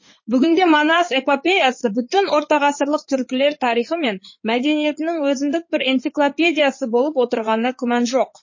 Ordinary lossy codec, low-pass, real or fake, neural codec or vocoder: MP3, 32 kbps; 7.2 kHz; fake; codec, 16 kHz in and 24 kHz out, 2.2 kbps, FireRedTTS-2 codec